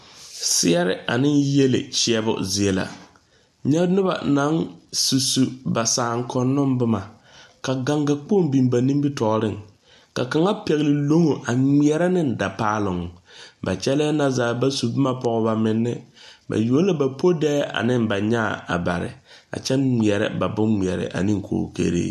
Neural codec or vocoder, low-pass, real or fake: none; 14.4 kHz; real